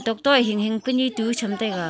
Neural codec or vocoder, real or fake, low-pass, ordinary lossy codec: none; real; none; none